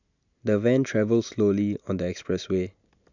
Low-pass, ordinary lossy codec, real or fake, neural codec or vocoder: 7.2 kHz; none; real; none